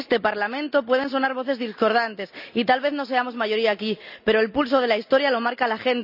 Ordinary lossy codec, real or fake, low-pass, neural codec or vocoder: none; real; 5.4 kHz; none